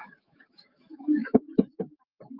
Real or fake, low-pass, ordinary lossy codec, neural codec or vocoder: fake; 5.4 kHz; Opus, 24 kbps; autoencoder, 48 kHz, 128 numbers a frame, DAC-VAE, trained on Japanese speech